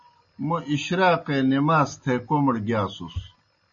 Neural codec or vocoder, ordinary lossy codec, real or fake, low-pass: none; MP3, 32 kbps; real; 7.2 kHz